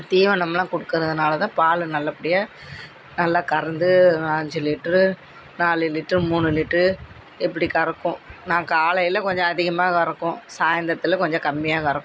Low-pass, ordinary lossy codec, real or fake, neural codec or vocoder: none; none; real; none